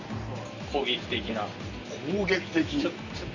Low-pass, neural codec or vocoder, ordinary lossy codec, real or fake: 7.2 kHz; none; none; real